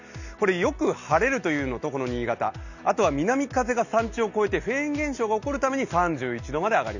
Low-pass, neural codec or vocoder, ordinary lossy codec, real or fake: 7.2 kHz; none; none; real